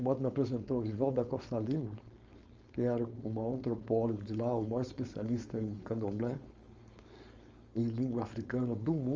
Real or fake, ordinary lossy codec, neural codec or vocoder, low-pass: fake; Opus, 32 kbps; codec, 16 kHz, 4.8 kbps, FACodec; 7.2 kHz